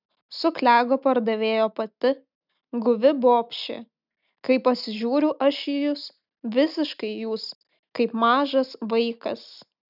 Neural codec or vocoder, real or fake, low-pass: none; real; 5.4 kHz